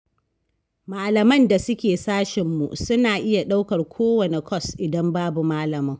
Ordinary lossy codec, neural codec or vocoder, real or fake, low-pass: none; none; real; none